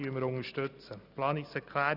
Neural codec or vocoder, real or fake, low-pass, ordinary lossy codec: none; real; 5.4 kHz; none